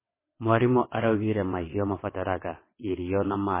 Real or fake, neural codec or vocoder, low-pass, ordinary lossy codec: fake; vocoder, 22.05 kHz, 80 mel bands, WaveNeXt; 3.6 kHz; MP3, 16 kbps